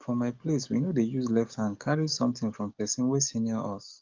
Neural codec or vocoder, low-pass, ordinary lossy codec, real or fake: codec, 44.1 kHz, 7.8 kbps, DAC; 7.2 kHz; Opus, 24 kbps; fake